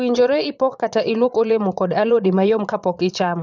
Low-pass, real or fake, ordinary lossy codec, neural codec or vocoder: 7.2 kHz; fake; none; vocoder, 22.05 kHz, 80 mel bands, Vocos